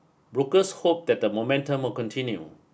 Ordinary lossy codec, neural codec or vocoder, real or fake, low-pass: none; none; real; none